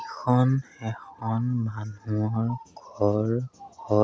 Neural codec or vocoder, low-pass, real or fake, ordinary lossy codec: none; none; real; none